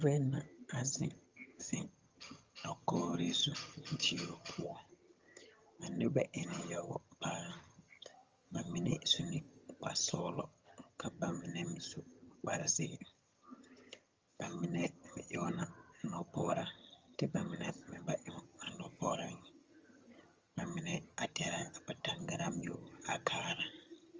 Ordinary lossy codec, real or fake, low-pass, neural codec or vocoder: Opus, 32 kbps; fake; 7.2 kHz; vocoder, 22.05 kHz, 80 mel bands, HiFi-GAN